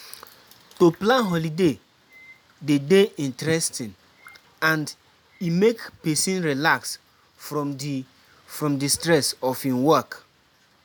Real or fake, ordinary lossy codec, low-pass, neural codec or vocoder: real; none; none; none